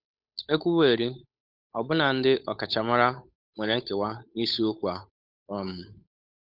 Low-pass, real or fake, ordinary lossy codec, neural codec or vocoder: 5.4 kHz; fake; none; codec, 16 kHz, 8 kbps, FunCodec, trained on Chinese and English, 25 frames a second